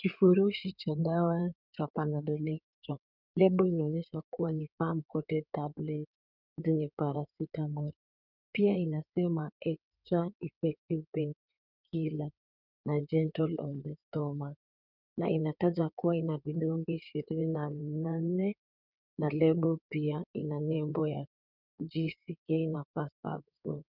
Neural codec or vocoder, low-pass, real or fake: codec, 16 kHz in and 24 kHz out, 2.2 kbps, FireRedTTS-2 codec; 5.4 kHz; fake